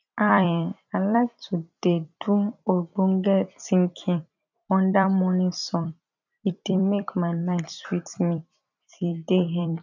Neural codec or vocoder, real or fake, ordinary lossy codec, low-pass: vocoder, 44.1 kHz, 128 mel bands every 256 samples, BigVGAN v2; fake; none; 7.2 kHz